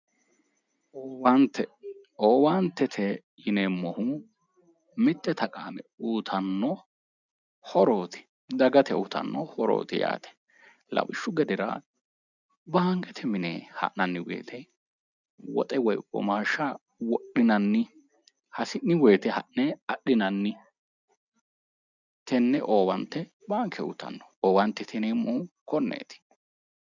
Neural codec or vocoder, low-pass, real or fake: none; 7.2 kHz; real